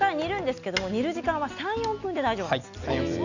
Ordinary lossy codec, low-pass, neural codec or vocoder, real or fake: none; 7.2 kHz; none; real